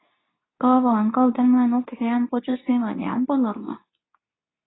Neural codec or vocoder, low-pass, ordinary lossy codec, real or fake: codec, 24 kHz, 0.9 kbps, WavTokenizer, medium speech release version 2; 7.2 kHz; AAC, 16 kbps; fake